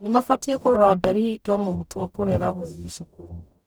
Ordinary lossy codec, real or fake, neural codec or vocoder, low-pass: none; fake; codec, 44.1 kHz, 0.9 kbps, DAC; none